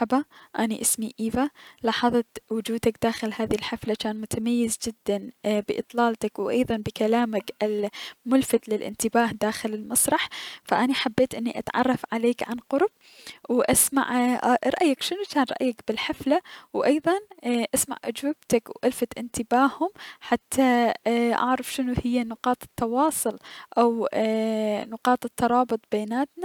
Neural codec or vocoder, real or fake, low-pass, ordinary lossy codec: none; real; 19.8 kHz; none